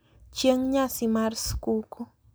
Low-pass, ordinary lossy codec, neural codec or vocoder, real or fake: none; none; none; real